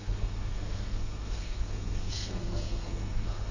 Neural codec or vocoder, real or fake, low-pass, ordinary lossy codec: codec, 32 kHz, 1.9 kbps, SNAC; fake; 7.2 kHz; none